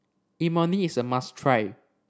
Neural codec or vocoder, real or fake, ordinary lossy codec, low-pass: none; real; none; none